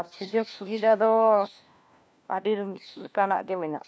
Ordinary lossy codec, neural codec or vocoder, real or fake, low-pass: none; codec, 16 kHz, 1 kbps, FunCodec, trained on LibriTTS, 50 frames a second; fake; none